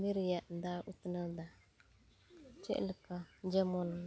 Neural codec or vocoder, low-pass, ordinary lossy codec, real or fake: none; none; none; real